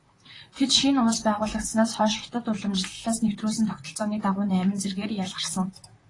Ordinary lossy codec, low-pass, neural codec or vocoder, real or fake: AAC, 32 kbps; 10.8 kHz; vocoder, 44.1 kHz, 128 mel bands, Pupu-Vocoder; fake